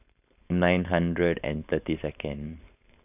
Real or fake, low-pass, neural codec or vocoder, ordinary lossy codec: fake; 3.6 kHz; codec, 16 kHz, 4.8 kbps, FACodec; none